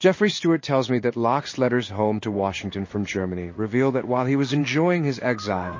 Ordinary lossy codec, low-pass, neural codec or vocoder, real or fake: MP3, 32 kbps; 7.2 kHz; codec, 16 kHz in and 24 kHz out, 1 kbps, XY-Tokenizer; fake